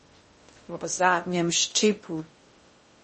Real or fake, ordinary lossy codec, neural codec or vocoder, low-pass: fake; MP3, 32 kbps; codec, 16 kHz in and 24 kHz out, 0.6 kbps, FocalCodec, streaming, 2048 codes; 10.8 kHz